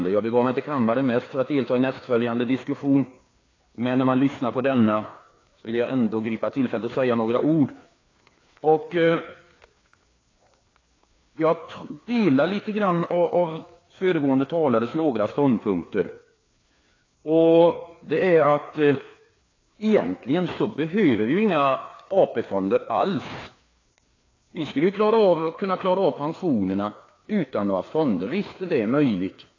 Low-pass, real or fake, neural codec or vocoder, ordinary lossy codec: 7.2 kHz; fake; codec, 16 kHz, 2 kbps, FreqCodec, larger model; AAC, 32 kbps